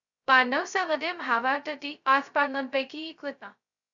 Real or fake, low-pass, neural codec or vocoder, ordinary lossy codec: fake; 7.2 kHz; codec, 16 kHz, 0.2 kbps, FocalCodec; MP3, 96 kbps